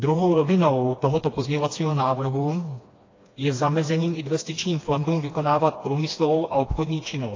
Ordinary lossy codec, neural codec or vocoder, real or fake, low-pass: AAC, 32 kbps; codec, 16 kHz, 2 kbps, FreqCodec, smaller model; fake; 7.2 kHz